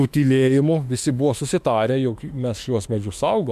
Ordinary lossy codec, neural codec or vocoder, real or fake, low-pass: MP3, 96 kbps; autoencoder, 48 kHz, 32 numbers a frame, DAC-VAE, trained on Japanese speech; fake; 14.4 kHz